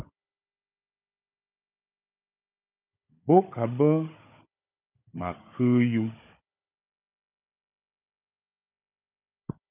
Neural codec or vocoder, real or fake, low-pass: codec, 16 kHz, 16 kbps, FunCodec, trained on Chinese and English, 50 frames a second; fake; 3.6 kHz